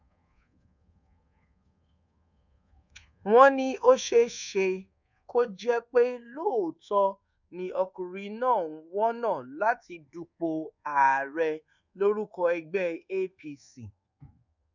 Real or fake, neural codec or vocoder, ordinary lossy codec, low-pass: fake; codec, 24 kHz, 1.2 kbps, DualCodec; none; 7.2 kHz